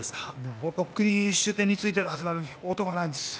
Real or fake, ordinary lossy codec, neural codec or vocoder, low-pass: fake; none; codec, 16 kHz, 0.8 kbps, ZipCodec; none